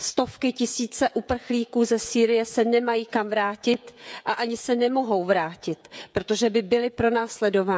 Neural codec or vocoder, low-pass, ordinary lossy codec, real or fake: codec, 16 kHz, 16 kbps, FreqCodec, smaller model; none; none; fake